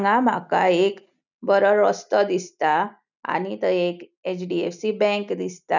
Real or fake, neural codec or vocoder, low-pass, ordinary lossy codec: real; none; 7.2 kHz; none